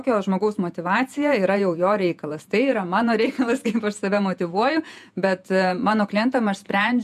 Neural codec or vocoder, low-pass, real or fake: vocoder, 48 kHz, 128 mel bands, Vocos; 14.4 kHz; fake